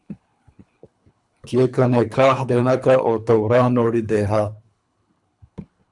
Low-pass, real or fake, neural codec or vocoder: 10.8 kHz; fake; codec, 24 kHz, 3 kbps, HILCodec